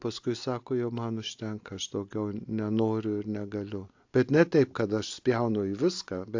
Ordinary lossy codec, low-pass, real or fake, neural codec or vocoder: AAC, 48 kbps; 7.2 kHz; real; none